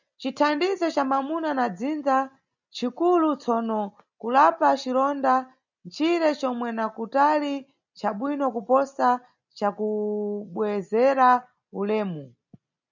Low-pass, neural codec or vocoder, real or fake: 7.2 kHz; none; real